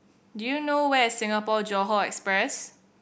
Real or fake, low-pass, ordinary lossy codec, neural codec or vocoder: real; none; none; none